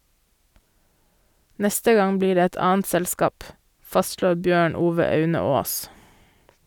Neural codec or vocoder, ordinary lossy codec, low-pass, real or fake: none; none; none; real